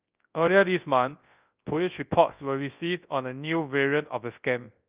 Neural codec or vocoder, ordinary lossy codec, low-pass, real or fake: codec, 24 kHz, 0.9 kbps, WavTokenizer, large speech release; Opus, 24 kbps; 3.6 kHz; fake